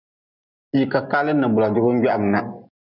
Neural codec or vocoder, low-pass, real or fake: none; 5.4 kHz; real